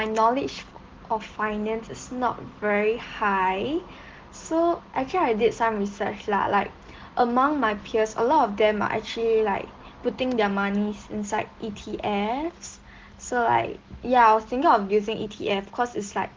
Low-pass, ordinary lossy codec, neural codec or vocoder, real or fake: 7.2 kHz; Opus, 16 kbps; none; real